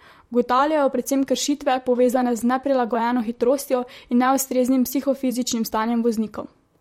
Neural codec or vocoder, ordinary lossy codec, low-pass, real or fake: vocoder, 44.1 kHz, 128 mel bands, Pupu-Vocoder; MP3, 64 kbps; 19.8 kHz; fake